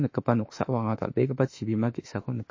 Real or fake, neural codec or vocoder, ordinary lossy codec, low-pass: fake; autoencoder, 48 kHz, 32 numbers a frame, DAC-VAE, trained on Japanese speech; MP3, 32 kbps; 7.2 kHz